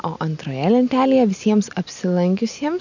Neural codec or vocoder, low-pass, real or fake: none; 7.2 kHz; real